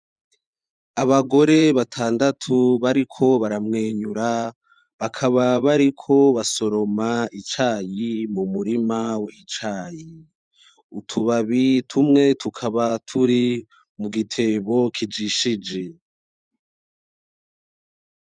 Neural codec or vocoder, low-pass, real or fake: vocoder, 24 kHz, 100 mel bands, Vocos; 9.9 kHz; fake